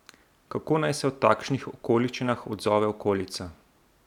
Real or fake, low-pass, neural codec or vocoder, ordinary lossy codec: real; 19.8 kHz; none; none